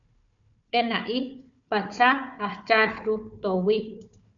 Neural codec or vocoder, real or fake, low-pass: codec, 16 kHz, 4 kbps, FunCodec, trained on Chinese and English, 50 frames a second; fake; 7.2 kHz